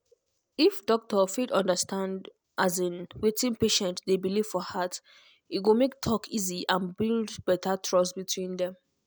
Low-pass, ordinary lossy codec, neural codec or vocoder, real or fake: none; none; none; real